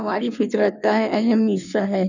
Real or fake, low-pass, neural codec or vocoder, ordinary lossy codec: fake; 7.2 kHz; codec, 16 kHz in and 24 kHz out, 1.1 kbps, FireRedTTS-2 codec; none